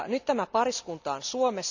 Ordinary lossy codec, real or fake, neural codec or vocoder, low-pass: none; real; none; 7.2 kHz